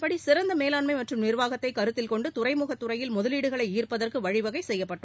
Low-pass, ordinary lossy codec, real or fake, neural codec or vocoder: none; none; real; none